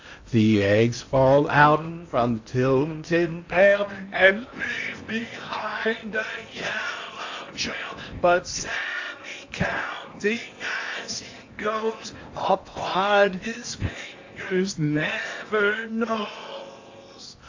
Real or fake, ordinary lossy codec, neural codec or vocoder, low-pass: fake; AAC, 48 kbps; codec, 16 kHz in and 24 kHz out, 0.8 kbps, FocalCodec, streaming, 65536 codes; 7.2 kHz